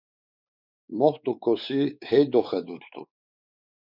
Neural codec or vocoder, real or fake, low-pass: codec, 16 kHz, 4 kbps, X-Codec, WavLM features, trained on Multilingual LibriSpeech; fake; 5.4 kHz